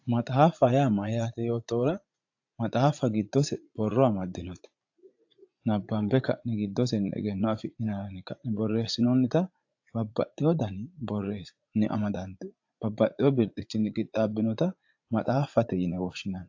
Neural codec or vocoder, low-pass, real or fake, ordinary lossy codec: vocoder, 44.1 kHz, 80 mel bands, Vocos; 7.2 kHz; fake; AAC, 48 kbps